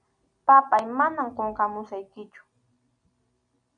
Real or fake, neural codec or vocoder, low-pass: real; none; 9.9 kHz